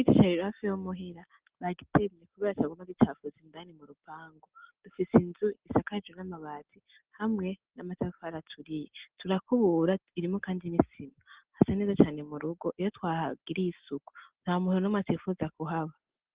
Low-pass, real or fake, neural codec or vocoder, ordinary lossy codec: 3.6 kHz; real; none; Opus, 16 kbps